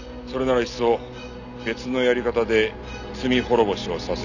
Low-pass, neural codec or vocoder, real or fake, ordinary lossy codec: 7.2 kHz; none; real; none